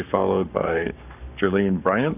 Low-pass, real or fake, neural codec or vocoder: 3.6 kHz; fake; codec, 44.1 kHz, 7.8 kbps, Pupu-Codec